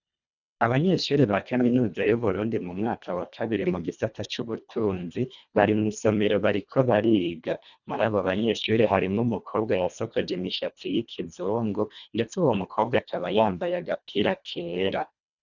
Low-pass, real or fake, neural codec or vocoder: 7.2 kHz; fake; codec, 24 kHz, 1.5 kbps, HILCodec